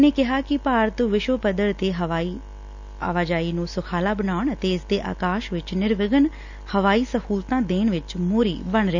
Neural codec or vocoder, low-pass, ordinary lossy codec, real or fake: none; 7.2 kHz; none; real